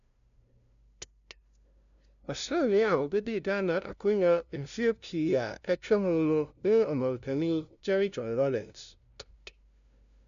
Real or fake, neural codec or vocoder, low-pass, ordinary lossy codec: fake; codec, 16 kHz, 0.5 kbps, FunCodec, trained on LibriTTS, 25 frames a second; 7.2 kHz; none